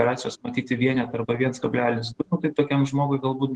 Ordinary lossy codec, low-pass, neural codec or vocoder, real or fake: Opus, 16 kbps; 10.8 kHz; none; real